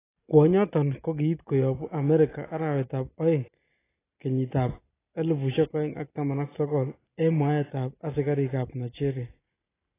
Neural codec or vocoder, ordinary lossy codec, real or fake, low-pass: none; AAC, 16 kbps; real; 3.6 kHz